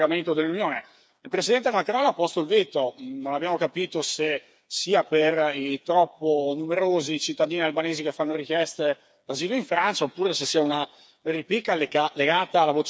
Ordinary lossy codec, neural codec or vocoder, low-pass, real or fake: none; codec, 16 kHz, 4 kbps, FreqCodec, smaller model; none; fake